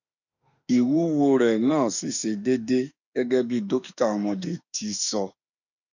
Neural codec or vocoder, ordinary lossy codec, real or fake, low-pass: autoencoder, 48 kHz, 32 numbers a frame, DAC-VAE, trained on Japanese speech; none; fake; 7.2 kHz